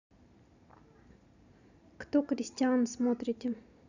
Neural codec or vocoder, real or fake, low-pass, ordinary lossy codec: none; real; 7.2 kHz; none